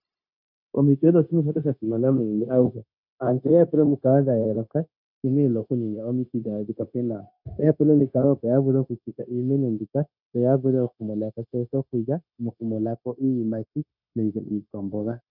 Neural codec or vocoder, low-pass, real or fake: codec, 16 kHz, 0.9 kbps, LongCat-Audio-Codec; 5.4 kHz; fake